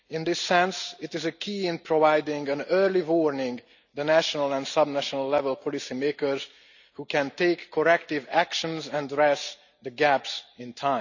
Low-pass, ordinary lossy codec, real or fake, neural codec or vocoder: 7.2 kHz; none; real; none